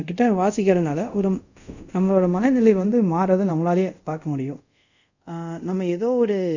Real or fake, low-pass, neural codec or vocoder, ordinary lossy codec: fake; 7.2 kHz; codec, 24 kHz, 0.5 kbps, DualCodec; none